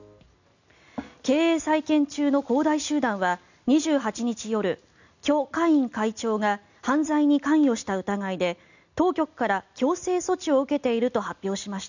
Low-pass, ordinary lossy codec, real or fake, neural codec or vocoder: 7.2 kHz; none; real; none